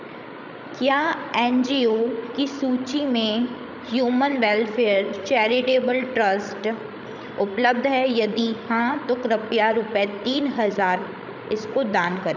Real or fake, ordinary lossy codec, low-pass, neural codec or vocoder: fake; none; 7.2 kHz; codec, 16 kHz, 16 kbps, FreqCodec, larger model